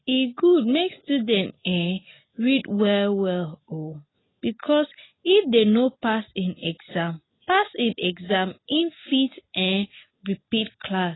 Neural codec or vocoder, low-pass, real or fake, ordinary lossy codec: none; 7.2 kHz; real; AAC, 16 kbps